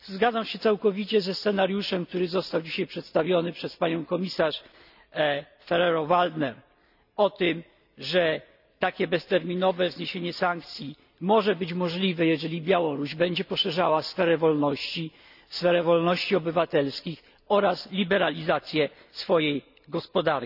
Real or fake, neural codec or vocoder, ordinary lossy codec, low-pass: real; none; none; 5.4 kHz